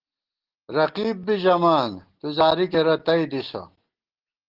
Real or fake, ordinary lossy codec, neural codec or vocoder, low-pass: real; Opus, 16 kbps; none; 5.4 kHz